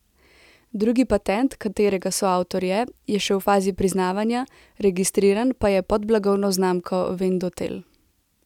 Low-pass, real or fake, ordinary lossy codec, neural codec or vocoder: 19.8 kHz; real; none; none